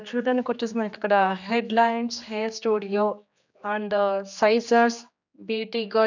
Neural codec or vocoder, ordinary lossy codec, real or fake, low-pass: codec, 16 kHz, 2 kbps, X-Codec, HuBERT features, trained on general audio; none; fake; 7.2 kHz